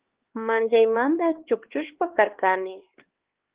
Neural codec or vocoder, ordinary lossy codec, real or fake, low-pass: codec, 16 kHz, 4 kbps, X-Codec, HuBERT features, trained on LibriSpeech; Opus, 16 kbps; fake; 3.6 kHz